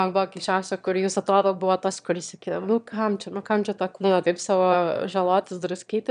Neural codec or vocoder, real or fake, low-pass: autoencoder, 22.05 kHz, a latent of 192 numbers a frame, VITS, trained on one speaker; fake; 9.9 kHz